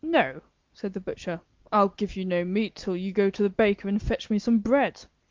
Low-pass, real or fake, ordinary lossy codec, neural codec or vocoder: 7.2 kHz; real; Opus, 24 kbps; none